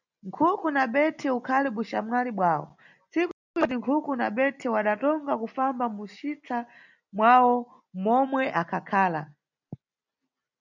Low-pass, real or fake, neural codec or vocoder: 7.2 kHz; real; none